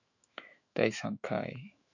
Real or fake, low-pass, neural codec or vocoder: fake; 7.2 kHz; autoencoder, 48 kHz, 128 numbers a frame, DAC-VAE, trained on Japanese speech